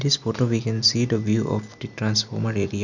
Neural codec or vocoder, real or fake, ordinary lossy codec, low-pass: none; real; none; 7.2 kHz